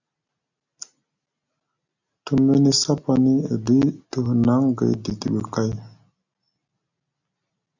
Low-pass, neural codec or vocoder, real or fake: 7.2 kHz; none; real